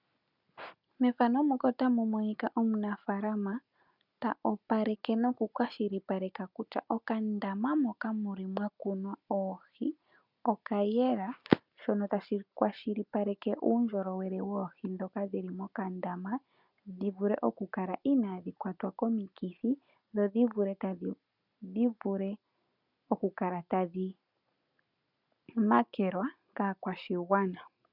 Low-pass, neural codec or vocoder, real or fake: 5.4 kHz; none; real